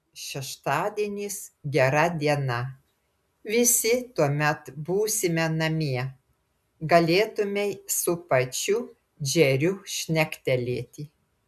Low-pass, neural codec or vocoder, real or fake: 14.4 kHz; none; real